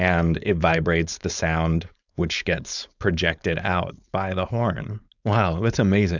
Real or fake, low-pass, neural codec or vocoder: fake; 7.2 kHz; codec, 16 kHz, 4.8 kbps, FACodec